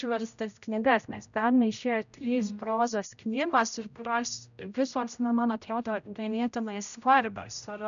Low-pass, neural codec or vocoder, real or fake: 7.2 kHz; codec, 16 kHz, 0.5 kbps, X-Codec, HuBERT features, trained on general audio; fake